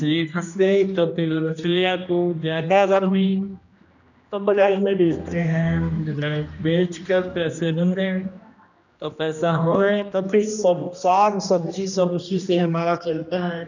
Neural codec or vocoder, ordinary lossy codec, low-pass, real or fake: codec, 16 kHz, 1 kbps, X-Codec, HuBERT features, trained on general audio; none; 7.2 kHz; fake